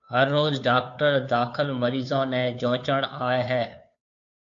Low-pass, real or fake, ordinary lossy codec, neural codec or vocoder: 7.2 kHz; fake; AAC, 64 kbps; codec, 16 kHz, 2 kbps, FunCodec, trained on Chinese and English, 25 frames a second